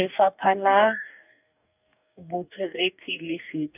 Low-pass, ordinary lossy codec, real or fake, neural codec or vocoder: 3.6 kHz; none; fake; codec, 44.1 kHz, 2.6 kbps, DAC